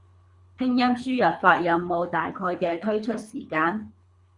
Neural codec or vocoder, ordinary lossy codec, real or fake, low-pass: codec, 24 kHz, 3 kbps, HILCodec; Opus, 64 kbps; fake; 10.8 kHz